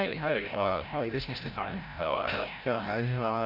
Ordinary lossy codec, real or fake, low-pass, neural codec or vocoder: none; fake; 5.4 kHz; codec, 16 kHz, 0.5 kbps, FreqCodec, larger model